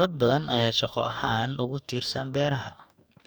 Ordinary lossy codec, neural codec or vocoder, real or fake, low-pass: none; codec, 44.1 kHz, 2.6 kbps, DAC; fake; none